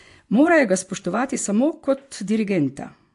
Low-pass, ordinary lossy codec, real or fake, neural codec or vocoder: 10.8 kHz; AAC, 64 kbps; fake; vocoder, 24 kHz, 100 mel bands, Vocos